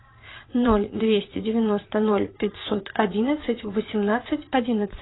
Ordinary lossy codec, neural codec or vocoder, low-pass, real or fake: AAC, 16 kbps; vocoder, 22.05 kHz, 80 mel bands, Vocos; 7.2 kHz; fake